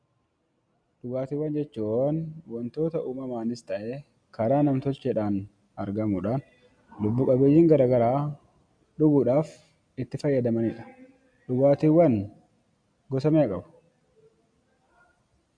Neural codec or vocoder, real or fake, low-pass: none; real; 9.9 kHz